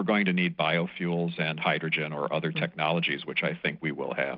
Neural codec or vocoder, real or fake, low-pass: none; real; 5.4 kHz